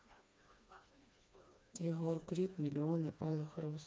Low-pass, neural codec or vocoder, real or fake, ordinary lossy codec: none; codec, 16 kHz, 2 kbps, FreqCodec, smaller model; fake; none